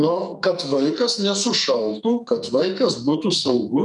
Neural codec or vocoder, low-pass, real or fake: codec, 44.1 kHz, 2.6 kbps, SNAC; 10.8 kHz; fake